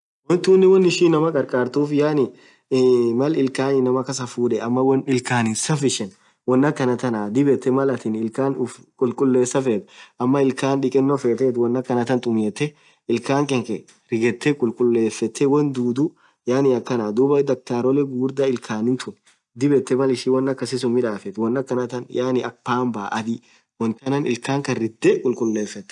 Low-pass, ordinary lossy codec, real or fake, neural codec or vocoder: 10.8 kHz; none; real; none